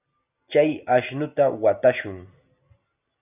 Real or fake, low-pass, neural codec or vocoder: real; 3.6 kHz; none